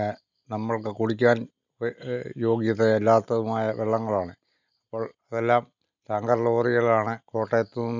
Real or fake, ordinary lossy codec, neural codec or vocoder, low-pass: real; none; none; 7.2 kHz